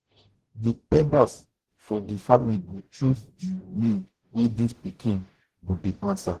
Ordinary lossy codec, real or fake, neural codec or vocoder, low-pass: Opus, 16 kbps; fake; codec, 44.1 kHz, 0.9 kbps, DAC; 14.4 kHz